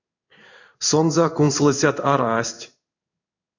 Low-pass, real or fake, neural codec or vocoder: 7.2 kHz; fake; codec, 16 kHz in and 24 kHz out, 1 kbps, XY-Tokenizer